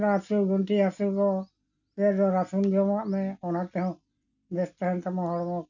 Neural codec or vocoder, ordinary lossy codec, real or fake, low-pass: none; none; real; 7.2 kHz